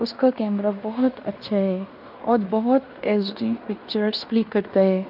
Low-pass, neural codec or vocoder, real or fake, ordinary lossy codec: 5.4 kHz; codec, 16 kHz in and 24 kHz out, 0.9 kbps, LongCat-Audio-Codec, fine tuned four codebook decoder; fake; none